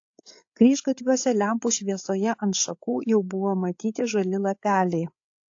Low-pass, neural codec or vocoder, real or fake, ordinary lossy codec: 7.2 kHz; codec, 16 kHz, 8 kbps, FreqCodec, larger model; fake; AAC, 48 kbps